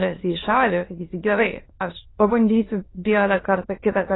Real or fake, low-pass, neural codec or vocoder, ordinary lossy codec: fake; 7.2 kHz; autoencoder, 22.05 kHz, a latent of 192 numbers a frame, VITS, trained on many speakers; AAC, 16 kbps